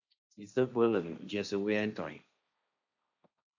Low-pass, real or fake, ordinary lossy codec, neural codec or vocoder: 7.2 kHz; fake; AAC, 48 kbps; codec, 16 kHz, 1.1 kbps, Voila-Tokenizer